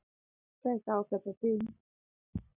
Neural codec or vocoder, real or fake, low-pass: none; real; 3.6 kHz